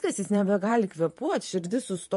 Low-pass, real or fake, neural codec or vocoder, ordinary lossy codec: 14.4 kHz; fake; vocoder, 44.1 kHz, 128 mel bands, Pupu-Vocoder; MP3, 48 kbps